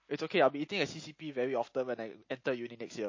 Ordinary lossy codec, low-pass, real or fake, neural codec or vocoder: MP3, 32 kbps; 7.2 kHz; real; none